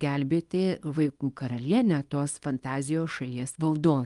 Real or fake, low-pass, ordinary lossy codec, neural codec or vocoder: fake; 10.8 kHz; Opus, 32 kbps; codec, 24 kHz, 0.9 kbps, WavTokenizer, small release